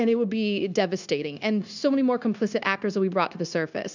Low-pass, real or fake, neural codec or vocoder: 7.2 kHz; fake; codec, 16 kHz, 0.9 kbps, LongCat-Audio-Codec